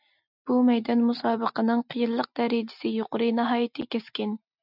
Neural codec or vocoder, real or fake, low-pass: none; real; 5.4 kHz